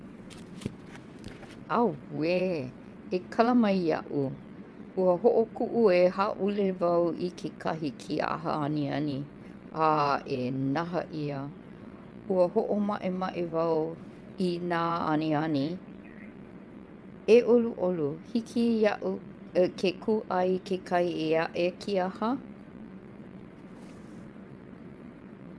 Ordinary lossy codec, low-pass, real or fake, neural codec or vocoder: none; none; fake; vocoder, 22.05 kHz, 80 mel bands, WaveNeXt